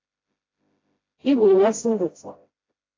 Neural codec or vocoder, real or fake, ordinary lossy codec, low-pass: codec, 16 kHz, 0.5 kbps, FreqCodec, smaller model; fake; AAC, 32 kbps; 7.2 kHz